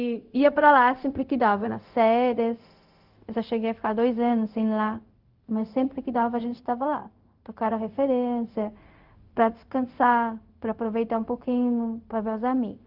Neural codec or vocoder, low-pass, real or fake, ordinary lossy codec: codec, 16 kHz, 0.4 kbps, LongCat-Audio-Codec; 5.4 kHz; fake; Opus, 32 kbps